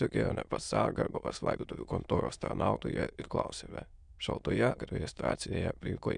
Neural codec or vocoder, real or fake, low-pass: autoencoder, 22.05 kHz, a latent of 192 numbers a frame, VITS, trained on many speakers; fake; 9.9 kHz